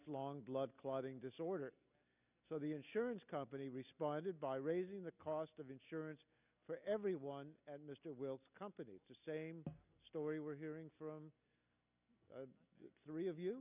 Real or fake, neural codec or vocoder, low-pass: real; none; 3.6 kHz